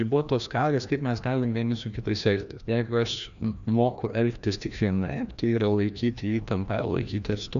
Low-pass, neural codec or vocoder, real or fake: 7.2 kHz; codec, 16 kHz, 1 kbps, FreqCodec, larger model; fake